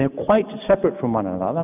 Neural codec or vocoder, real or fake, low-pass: none; real; 3.6 kHz